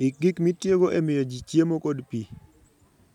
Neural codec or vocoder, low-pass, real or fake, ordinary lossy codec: none; 19.8 kHz; real; none